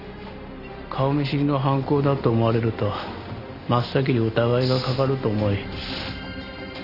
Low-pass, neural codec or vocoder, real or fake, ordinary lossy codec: 5.4 kHz; none; real; none